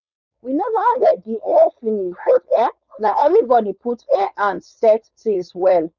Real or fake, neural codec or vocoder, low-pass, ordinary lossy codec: fake; codec, 16 kHz, 4.8 kbps, FACodec; 7.2 kHz; none